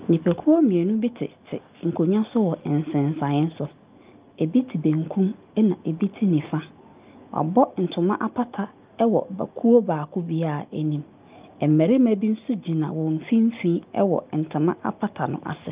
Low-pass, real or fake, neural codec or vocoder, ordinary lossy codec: 3.6 kHz; real; none; Opus, 24 kbps